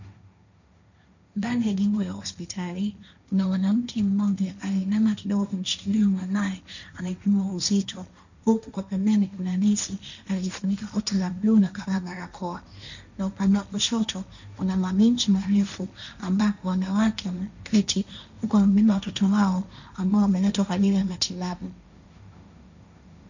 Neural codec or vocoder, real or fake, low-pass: codec, 16 kHz, 1.1 kbps, Voila-Tokenizer; fake; 7.2 kHz